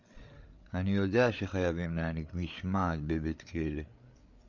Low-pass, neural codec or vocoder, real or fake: 7.2 kHz; codec, 16 kHz, 8 kbps, FreqCodec, larger model; fake